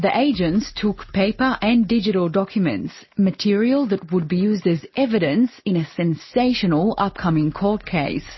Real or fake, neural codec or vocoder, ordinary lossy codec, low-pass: real; none; MP3, 24 kbps; 7.2 kHz